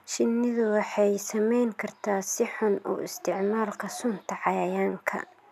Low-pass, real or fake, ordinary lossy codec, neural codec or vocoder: 14.4 kHz; real; none; none